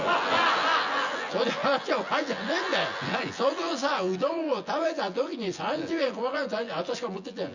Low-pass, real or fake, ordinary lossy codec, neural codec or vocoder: 7.2 kHz; fake; Opus, 64 kbps; vocoder, 24 kHz, 100 mel bands, Vocos